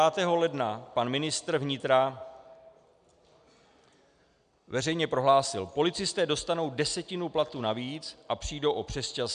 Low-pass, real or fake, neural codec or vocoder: 9.9 kHz; real; none